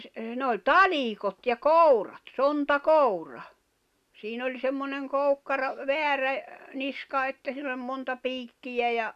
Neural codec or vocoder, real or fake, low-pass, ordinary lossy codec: none; real; 14.4 kHz; none